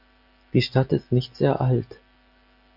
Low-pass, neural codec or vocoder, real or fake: 5.4 kHz; none; real